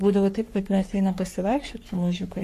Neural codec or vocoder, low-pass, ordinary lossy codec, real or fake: codec, 44.1 kHz, 3.4 kbps, Pupu-Codec; 14.4 kHz; AAC, 64 kbps; fake